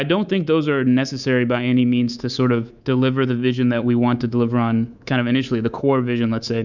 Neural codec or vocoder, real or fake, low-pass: codec, 24 kHz, 3.1 kbps, DualCodec; fake; 7.2 kHz